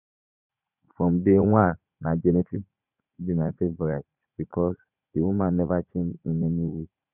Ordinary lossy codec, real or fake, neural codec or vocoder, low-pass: none; fake; vocoder, 44.1 kHz, 80 mel bands, Vocos; 3.6 kHz